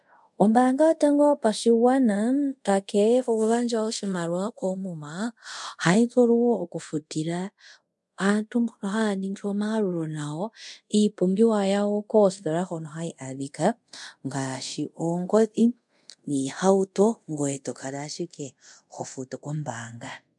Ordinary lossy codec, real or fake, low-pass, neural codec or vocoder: MP3, 64 kbps; fake; 10.8 kHz; codec, 24 kHz, 0.5 kbps, DualCodec